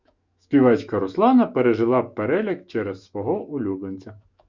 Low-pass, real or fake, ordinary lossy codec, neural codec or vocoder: 7.2 kHz; fake; Opus, 64 kbps; autoencoder, 48 kHz, 128 numbers a frame, DAC-VAE, trained on Japanese speech